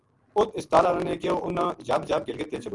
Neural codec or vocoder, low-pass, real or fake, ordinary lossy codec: none; 10.8 kHz; real; Opus, 24 kbps